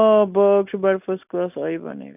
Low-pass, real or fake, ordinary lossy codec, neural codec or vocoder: 3.6 kHz; real; none; none